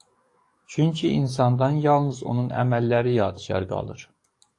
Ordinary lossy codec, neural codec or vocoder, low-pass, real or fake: AAC, 48 kbps; codec, 44.1 kHz, 7.8 kbps, DAC; 10.8 kHz; fake